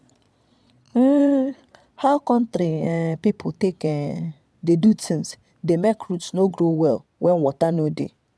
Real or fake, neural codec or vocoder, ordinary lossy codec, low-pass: fake; vocoder, 22.05 kHz, 80 mel bands, Vocos; none; none